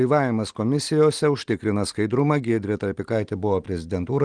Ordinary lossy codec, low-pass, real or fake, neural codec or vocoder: Opus, 24 kbps; 9.9 kHz; real; none